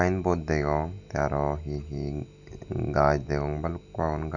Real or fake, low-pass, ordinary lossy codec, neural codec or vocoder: real; 7.2 kHz; none; none